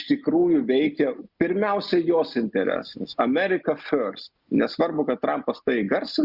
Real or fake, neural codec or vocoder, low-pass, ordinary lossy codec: real; none; 5.4 kHz; Opus, 64 kbps